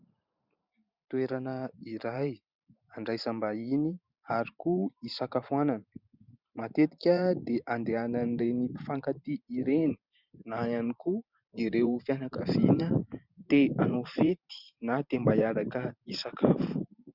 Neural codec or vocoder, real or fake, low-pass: vocoder, 44.1 kHz, 128 mel bands every 512 samples, BigVGAN v2; fake; 5.4 kHz